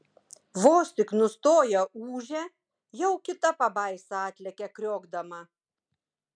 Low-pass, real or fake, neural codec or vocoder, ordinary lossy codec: 9.9 kHz; real; none; MP3, 96 kbps